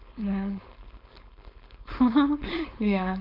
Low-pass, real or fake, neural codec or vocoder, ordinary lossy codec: 5.4 kHz; fake; codec, 16 kHz, 4.8 kbps, FACodec; none